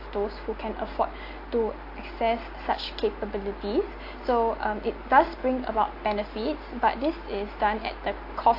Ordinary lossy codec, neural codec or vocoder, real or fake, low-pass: AAC, 32 kbps; none; real; 5.4 kHz